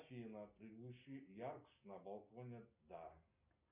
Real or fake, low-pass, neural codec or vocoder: real; 3.6 kHz; none